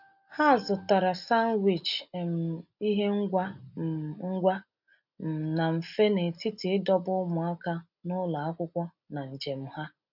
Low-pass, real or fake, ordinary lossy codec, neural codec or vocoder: 5.4 kHz; real; none; none